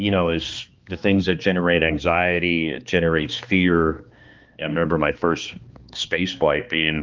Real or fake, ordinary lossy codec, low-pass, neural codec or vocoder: fake; Opus, 32 kbps; 7.2 kHz; codec, 16 kHz, 2 kbps, X-Codec, HuBERT features, trained on balanced general audio